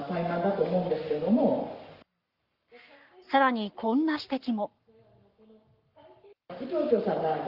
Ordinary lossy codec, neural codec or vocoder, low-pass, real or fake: Opus, 32 kbps; codec, 44.1 kHz, 7.8 kbps, Pupu-Codec; 5.4 kHz; fake